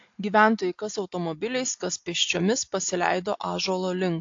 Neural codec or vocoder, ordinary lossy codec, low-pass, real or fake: none; AAC, 48 kbps; 7.2 kHz; real